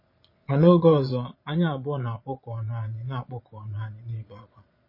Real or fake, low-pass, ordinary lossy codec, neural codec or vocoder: fake; 5.4 kHz; MP3, 24 kbps; vocoder, 22.05 kHz, 80 mel bands, Vocos